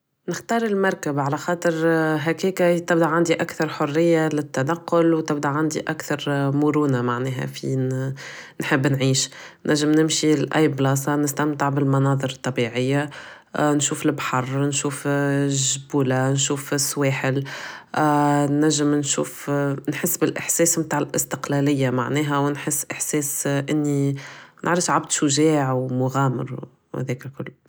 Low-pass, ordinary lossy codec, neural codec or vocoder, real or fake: none; none; none; real